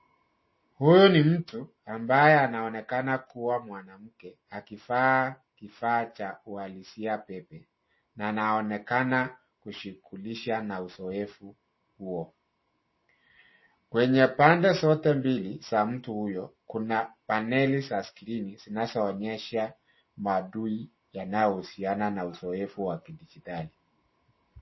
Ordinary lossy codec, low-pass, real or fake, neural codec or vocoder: MP3, 24 kbps; 7.2 kHz; real; none